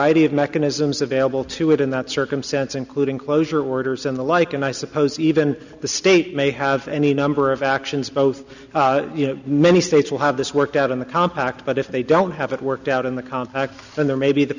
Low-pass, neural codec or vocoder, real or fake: 7.2 kHz; none; real